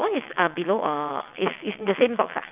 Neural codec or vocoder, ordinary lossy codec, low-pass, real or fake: vocoder, 22.05 kHz, 80 mel bands, WaveNeXt; none; 3.6 kHz; fake